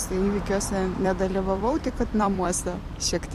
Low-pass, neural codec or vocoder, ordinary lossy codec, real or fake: 14.4 kHz; vocoder, 44.1 kHz, 128 mel bands every 256 samples, BigVGAN v2; MP3, 64 kbps; fake